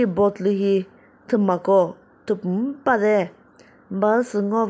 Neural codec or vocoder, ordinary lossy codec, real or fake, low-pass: none; none; real; none